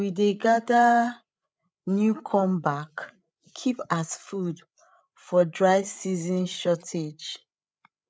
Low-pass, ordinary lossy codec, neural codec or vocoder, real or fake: none; none; codec, 16 kHz, 8 kbps, FreqCodec, larger model; fake